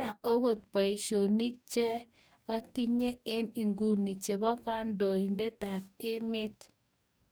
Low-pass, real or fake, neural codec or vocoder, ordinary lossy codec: none; fake; codec, 44.1 kHz, 2.6 kbps, DAC; none